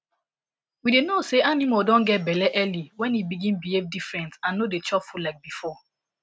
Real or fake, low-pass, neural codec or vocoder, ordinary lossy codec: real; none; none; none